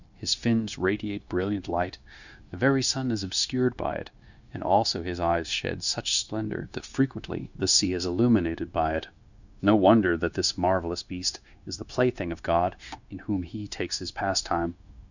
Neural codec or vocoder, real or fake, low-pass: codec, 16 kHz, 0.9 kbps, LongCat-Audio-Codec; fake; 7.2 kHz